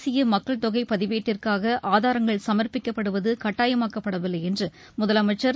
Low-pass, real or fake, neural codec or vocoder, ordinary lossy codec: none; real; none; none